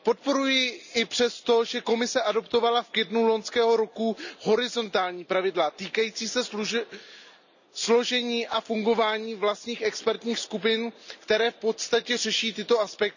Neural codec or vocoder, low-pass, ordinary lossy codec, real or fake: none; 7.2 kHz; none; real